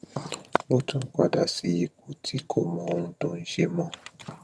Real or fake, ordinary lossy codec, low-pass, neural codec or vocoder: fake; none; none; vocoder, 22.05 kHz, 80 mel bands, HiFi-GAN